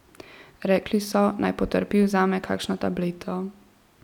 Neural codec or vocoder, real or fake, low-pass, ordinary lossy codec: none; real; 19.8 kHz; none